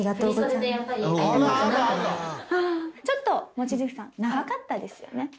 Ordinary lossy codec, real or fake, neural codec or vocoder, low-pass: none; real; none; none